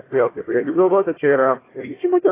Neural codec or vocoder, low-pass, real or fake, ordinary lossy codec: codec, 16 kHz, 1 kbps, FunCodec, trained on Chinese and English, 50 frames a second; 3.6 kHz; fake; AAC, 16 kbps